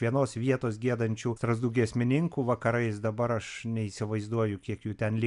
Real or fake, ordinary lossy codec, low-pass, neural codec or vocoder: real; MP3, 96 kbps; 10.8 kHz; none